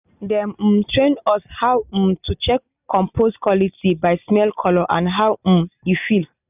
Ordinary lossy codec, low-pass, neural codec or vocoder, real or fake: none; 3.6 kHz; none; real